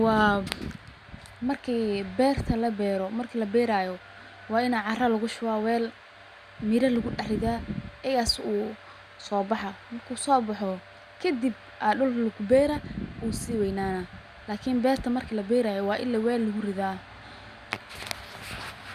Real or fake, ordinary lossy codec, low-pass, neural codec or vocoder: real; Opus, 64 kbps; 14.4 kHz; none